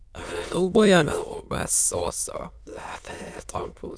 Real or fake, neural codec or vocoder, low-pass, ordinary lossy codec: fake; autoencoder, 22.05 kHz, a latent of 192 numbers a frame, VITS, trained on many speakers; none; none